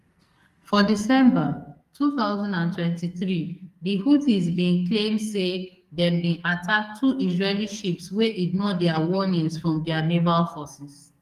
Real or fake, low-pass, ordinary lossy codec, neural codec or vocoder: fake; 14.4 kHz; Opus, 32 kbps; codec, 44.1 kHz, 2.6 kbps, SNAC